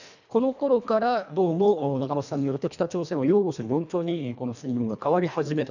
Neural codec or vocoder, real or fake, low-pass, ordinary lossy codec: codec, 24 kHz, 1.5 kbps, HILCodec; fake; 7.2 kHz; none